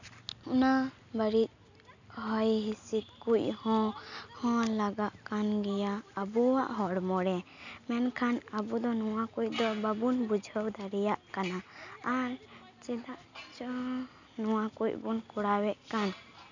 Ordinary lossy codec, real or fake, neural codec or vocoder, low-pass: none; real; none; 7.2 kHz